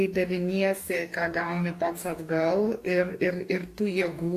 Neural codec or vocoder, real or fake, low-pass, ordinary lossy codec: codec, 44.1 kHz, 2.6 kbps, DAC; fake; 14.4 kHz; AAC, 64 kbps